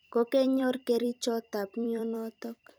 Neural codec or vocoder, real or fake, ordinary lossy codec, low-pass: vocoder, 44.1 kHz, 128 mel bands every 256 samples, BigVGAN v2; fake; none; none